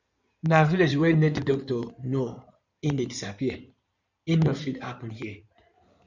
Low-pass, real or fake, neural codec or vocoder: 7.2 kHz; fake; codec, 16 kHz in and 24 kHz out, 2.2 kbps, FireRedTTS-2 codec